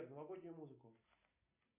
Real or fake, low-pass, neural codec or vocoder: real; 3.6 kHz; none